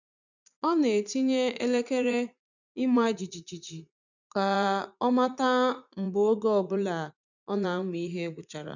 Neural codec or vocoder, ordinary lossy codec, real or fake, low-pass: vocoder, 44.1 kHz, 80 mel bands, Vocos; none; fake; 7.2 kHz